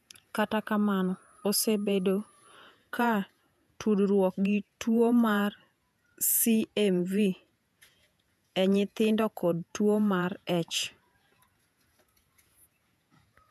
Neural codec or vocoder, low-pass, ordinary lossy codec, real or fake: vocoder, 48 kHz, 128 mel bands, Vocos; 14.4 kHz; none; fake